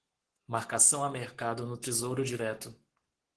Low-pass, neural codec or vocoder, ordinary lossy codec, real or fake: 10.8 kHz; codec, 44.1 kHz, 7.8 kbps, Pupu-Codec; Opus, 16 kbps; fake